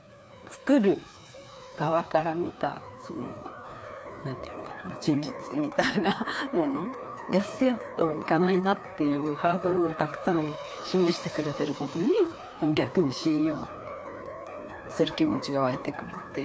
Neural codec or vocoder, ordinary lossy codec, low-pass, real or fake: codec, 16 kHz, 2 kbps, FreqCodec, larger model; none; none; fake